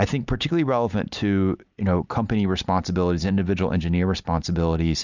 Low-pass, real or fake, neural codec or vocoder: 7.2 kHz; real; none